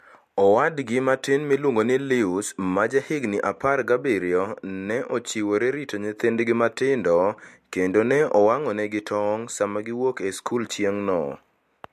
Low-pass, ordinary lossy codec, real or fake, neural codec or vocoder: 14.4 kHz; MP3, 64 kbps; real; none